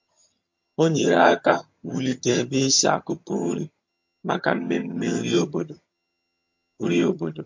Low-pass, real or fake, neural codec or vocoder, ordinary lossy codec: 7.2 kHz; fake; vocoder, 22.05 kHz, 80 mel bands, HiFi-GAN; MP3, 48 kbps